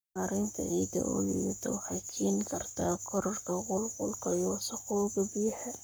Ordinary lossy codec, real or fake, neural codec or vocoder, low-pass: none; fake; codec, 44.1 kHz, 7.8 kbps, Pupu-Codec; none